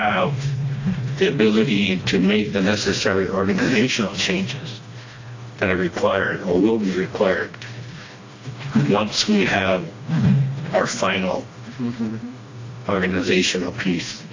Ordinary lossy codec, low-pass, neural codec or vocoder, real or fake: AAC, 32 kbps; 7.2 kHz; codec, 16 kHz, 1 kbps, FreqCodec, smaller model; fake